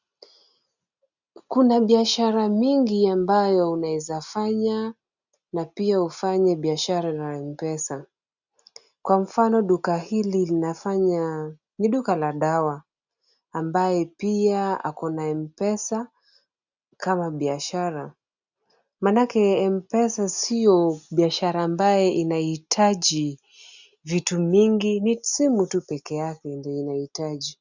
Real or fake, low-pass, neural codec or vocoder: real; 7.2 kHz; none